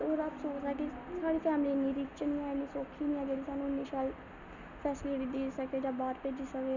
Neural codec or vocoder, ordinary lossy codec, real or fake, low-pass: none; none; real; 7.2 kHz